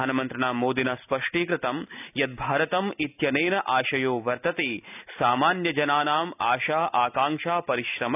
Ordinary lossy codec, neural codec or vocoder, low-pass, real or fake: none; none; 3.6 kHz; real